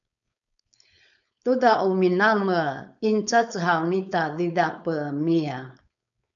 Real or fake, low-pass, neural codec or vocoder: fake; 7.2 kHz; codec, 16 kHz, 4.8 kbps, FACodec